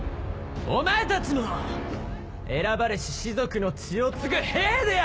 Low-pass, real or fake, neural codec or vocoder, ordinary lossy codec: none; real; none; none